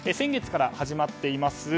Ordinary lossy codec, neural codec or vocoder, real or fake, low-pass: none; none; real; none